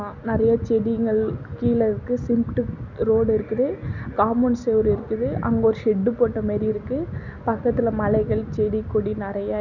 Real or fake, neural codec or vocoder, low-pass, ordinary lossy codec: real; none; 7.2 kHz; none